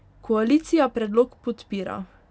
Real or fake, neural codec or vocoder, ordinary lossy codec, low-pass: real; none; none; none